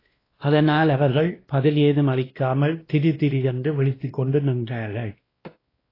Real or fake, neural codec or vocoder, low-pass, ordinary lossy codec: fake; codec, 16 kHz, 1 kbps, X-Codec, WavLM features, trained on Multilingual LibriSpeech; 5.4 kHz; AAC, 24 kbps